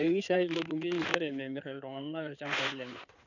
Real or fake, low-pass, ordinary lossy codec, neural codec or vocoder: fake; 7.2 kHz; none; codec, 16 kHz in and 24 kHz out, 2.2 kbps, FireRedTTS-2 codec